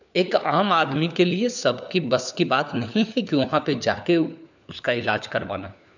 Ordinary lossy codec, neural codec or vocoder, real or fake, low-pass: none; codec, 16 kHz, 4 kbps, FunCodec, trained on LibriTTS, 50 frames a second; fake; 7.2 kHz